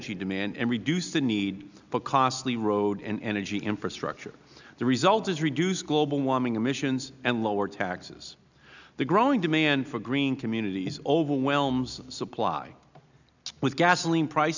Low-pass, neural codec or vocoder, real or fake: 7.2 kHz; none; real